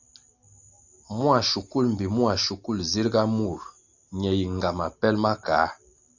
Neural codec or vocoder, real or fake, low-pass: none; real; 7.2 kHz